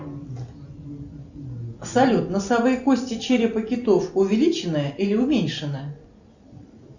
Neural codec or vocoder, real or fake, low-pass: none; real; 7.2 kHz